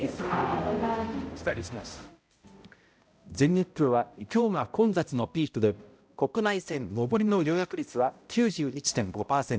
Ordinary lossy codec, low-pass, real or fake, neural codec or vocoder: none; none; fake; codec, 16 kHz, 0.5 kbps, X-Codec, HuBERT features, trained on balanced general audio